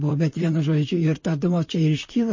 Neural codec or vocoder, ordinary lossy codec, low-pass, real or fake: vocoder, 44.1 kHz, 128 mel bands, Pupu-Vocoder; MP3, 32 kbps; 7.2 kHz; fake